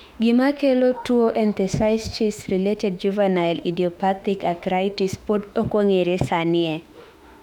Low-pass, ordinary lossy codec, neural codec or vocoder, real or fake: 19.8 kHz; none; autoencoder, 48 kHz, 32 numbers a frame, DAC-VAE, trained on Japanese speech; fake